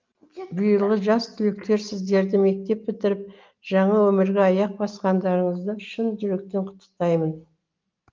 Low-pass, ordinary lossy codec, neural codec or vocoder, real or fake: 7.2 kHz; Opus, 32 kbps; none; real